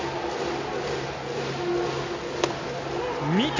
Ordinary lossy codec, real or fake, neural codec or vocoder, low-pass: MP3, 48 kbps; real; none; 7.2 kHz